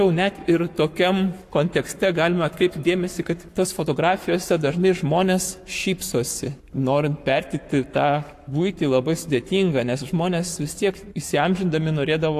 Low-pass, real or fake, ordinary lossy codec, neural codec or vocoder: 14.4 kHz; fake; AAC, 64 kbps; codec, 44.1 kHz, 7.8 kbps, Pupu-Codec